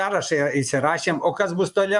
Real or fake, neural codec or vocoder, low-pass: fake; vocoder, 24 kHz, 100 mel bands, Vocos; 10.8 kHz